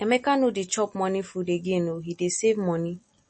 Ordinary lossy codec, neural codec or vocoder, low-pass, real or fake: MP3, 32 kbps; none; 9.9 kHz; real